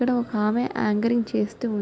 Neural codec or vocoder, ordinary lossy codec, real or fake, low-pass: none; none; real; none